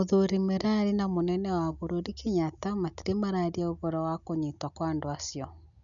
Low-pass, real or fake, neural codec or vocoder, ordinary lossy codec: 7.2 kHz; real; none; none